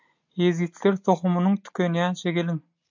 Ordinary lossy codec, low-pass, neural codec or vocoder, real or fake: MP3, 64 kbps; 7.2 kHz; none; real